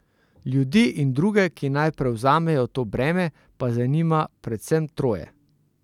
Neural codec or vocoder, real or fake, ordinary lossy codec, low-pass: none; real; none; 19.8 kHz